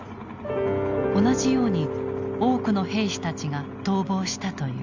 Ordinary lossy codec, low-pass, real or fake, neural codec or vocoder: none; 7.2 kHz; real; none